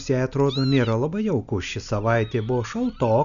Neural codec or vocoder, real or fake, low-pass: none; real; 7.2 kHz